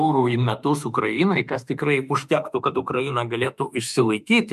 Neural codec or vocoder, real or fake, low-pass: autoencoder, 48 kHz, 32 numbers a frame, DAC-VAE, trained on Japanese speech; fake; 14.4 kHz